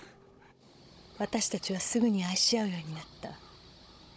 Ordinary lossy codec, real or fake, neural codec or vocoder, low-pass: none; fake; codec, 16 kHz, 16 kbps, FunCodec, trained on Chinese and English, 50 frames a second; none